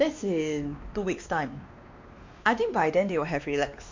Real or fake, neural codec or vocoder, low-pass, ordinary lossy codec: fake; codec, 16 kHz, 2 kbps, X-Codec, WavLM features, trained on Multilingual LibriSpeech; 7.2 kHz; MP3, 48 kbps